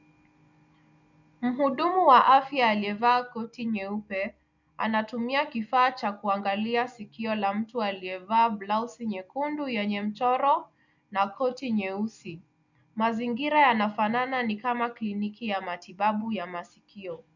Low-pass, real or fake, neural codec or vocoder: 7.2 kHz; real; none